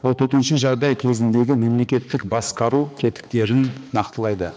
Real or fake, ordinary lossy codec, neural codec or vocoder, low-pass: fake; none; codec, 16 kHz, 2 kbps, X-Codec, HuBERT features, trained on balanced general audio; none